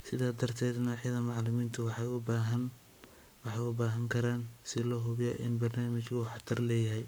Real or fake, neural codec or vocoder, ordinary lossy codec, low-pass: fake; codec, 44.1 kHz, 7.8 kbps, Pupu-Codec; none; none